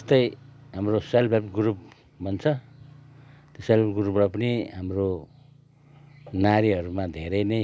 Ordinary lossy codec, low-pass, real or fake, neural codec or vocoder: none; none; real; none